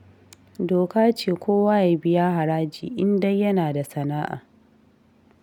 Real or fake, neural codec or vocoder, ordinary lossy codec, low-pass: real; none; none; 19.8 kHz